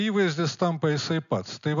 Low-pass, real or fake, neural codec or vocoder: 7.2 kHz; real; none